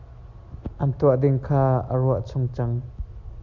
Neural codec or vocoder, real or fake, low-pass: none; real; 7.2 kHz